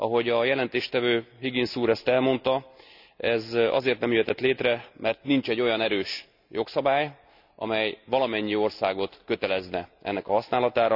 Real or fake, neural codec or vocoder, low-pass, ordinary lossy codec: real; none; 5.4 kHz; none